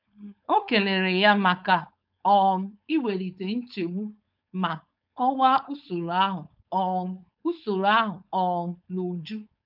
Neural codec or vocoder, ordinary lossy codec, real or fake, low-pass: codec, 16 kHz, 4.8 kbps, FACodec; none; fake; 5.4 kHz